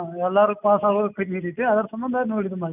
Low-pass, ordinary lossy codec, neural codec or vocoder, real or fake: 3.6 kHz; none; none; real